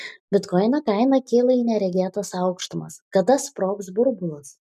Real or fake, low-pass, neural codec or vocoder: real; 14.4 kHz; none